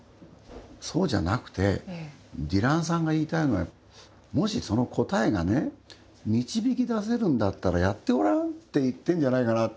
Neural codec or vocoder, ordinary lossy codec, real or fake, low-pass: none; none; real; none